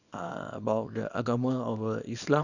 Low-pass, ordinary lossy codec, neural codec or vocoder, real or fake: 7.2 kHz; none; codec, 24 kHz, 0.9 kbps, WavTokenizer, small release; fake